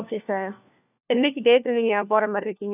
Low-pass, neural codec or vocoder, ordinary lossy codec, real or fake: 3.6 kHz; codec, 16 kHz, 1 kbps, FunCodec, trained on LibriTTS, 50 frames a second; none; fake